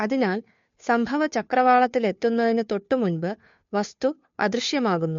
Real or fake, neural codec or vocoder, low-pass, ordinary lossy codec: fake; codec, 16 kHz, 2 kbps, FunCodec, trained on Chinese and English, 25 frames a second; 7.2 kHz; MP3, 48 kbps